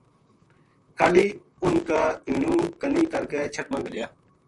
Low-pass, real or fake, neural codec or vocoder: 10.8 kHz; fake; vocoder, 44.1 kHz, 128 mel bands, Pupu-Vocoder